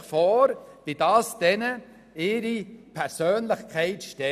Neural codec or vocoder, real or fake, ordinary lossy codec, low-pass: none; real; none; 14.4 kHz